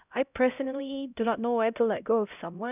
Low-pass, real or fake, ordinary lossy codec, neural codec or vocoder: 3.6 kHz; fake; none; codec, 16 kHz, 0.5 kbps, X-Codec, HuBERT features, trained on LibriSpeech